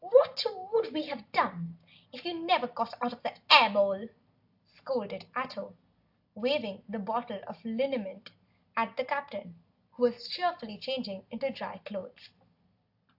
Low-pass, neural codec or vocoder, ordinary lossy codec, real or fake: 5.4 kHz; none; AAC, 48 kbps; real